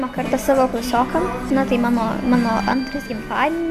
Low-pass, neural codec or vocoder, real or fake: 14.4 kHz; none; real